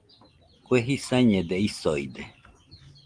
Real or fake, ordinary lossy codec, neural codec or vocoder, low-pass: real; Opus, 24 kbps; none; 9.9 kHz